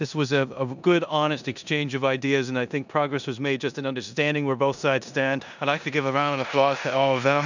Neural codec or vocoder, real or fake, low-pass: codec, 16 kHz in and 24 kHz out, 0.9 kbps, LongCat-Audio-Codec, four codebook decoder; fake; 7.2 kHz